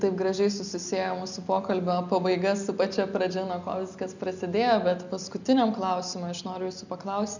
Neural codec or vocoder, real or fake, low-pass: none; real; 7.2 kHz